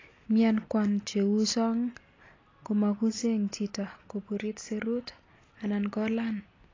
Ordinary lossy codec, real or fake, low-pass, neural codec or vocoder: AAC, 32 kbps; real; 7.2 kHz; none